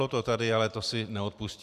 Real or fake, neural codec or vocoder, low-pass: fake; vocoder, 44.1 kHz, 128 mel bands every 512 samples, BigVGAN v2; 14.4 kHz